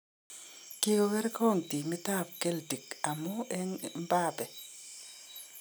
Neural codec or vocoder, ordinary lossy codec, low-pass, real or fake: none; none; none; real